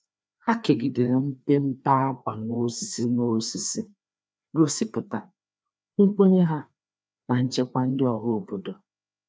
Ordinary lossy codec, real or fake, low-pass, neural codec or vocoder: none; fake; none; codec, 16 kHz, 2 kbps, FreqCodec, larger model